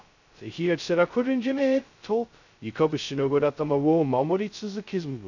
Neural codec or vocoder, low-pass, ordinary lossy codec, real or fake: codec, 16 kHz, 0.2 kbps, FocalCodec; 7.2 kHz; none; fake